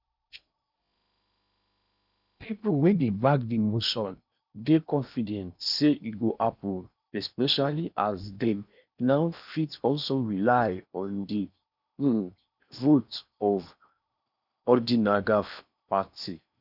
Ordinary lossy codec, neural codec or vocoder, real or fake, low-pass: none; codec, 16 kHz in and 24 kHz out, 0.8 kbps, FocalCodec, streaming, 65536 codes; fake; 5.4 kHz